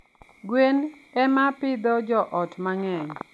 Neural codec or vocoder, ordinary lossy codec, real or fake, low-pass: none; none; real; none